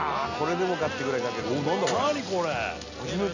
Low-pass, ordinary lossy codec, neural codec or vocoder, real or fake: 7.2 kHz; none; none; real